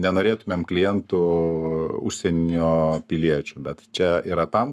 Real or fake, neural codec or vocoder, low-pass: fake; codec, 44.1 kHz, 7.8 kbps, Pupu-Codec; 14.4 kHz